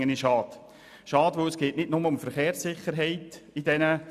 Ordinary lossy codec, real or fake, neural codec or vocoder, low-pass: none; real; none; 14.4 kHz